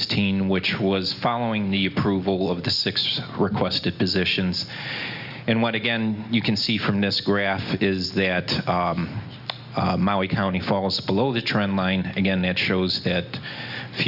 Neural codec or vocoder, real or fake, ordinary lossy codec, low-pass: none; real; Opus, 64 kbps; 5.4 kHz